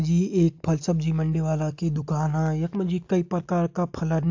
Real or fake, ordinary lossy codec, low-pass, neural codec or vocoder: real; AAC, 48 kbps; 7.2 kHz; none